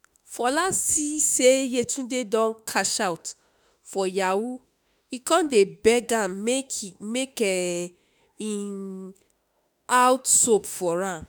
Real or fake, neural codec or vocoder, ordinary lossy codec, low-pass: fake; autoencoder, 48 kHz, 32 numbers a frame, DAC-VAE, trained on Japanese speech; none; none